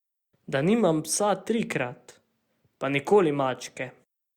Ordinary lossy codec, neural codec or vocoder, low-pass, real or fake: Opus, 64 kbps; none; 19.8 kHz; real